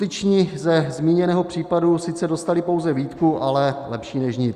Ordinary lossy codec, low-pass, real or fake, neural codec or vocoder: Opus, 64 kbps; 14.4 kHz; real; none